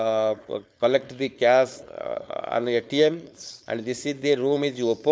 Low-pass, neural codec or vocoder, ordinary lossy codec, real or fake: none; codec, 16 kHz, 4.8 kbps, FACodec; none; fake